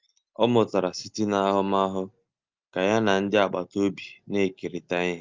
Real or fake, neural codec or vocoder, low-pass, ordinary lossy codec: real; none; 7.2 kHz; Opus, 24 kbps